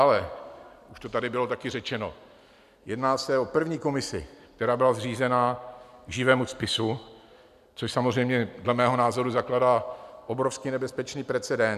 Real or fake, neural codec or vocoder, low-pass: real; none; 14.4 kHz